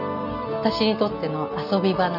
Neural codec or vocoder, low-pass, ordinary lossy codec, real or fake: none; 5.4 kHz; none; real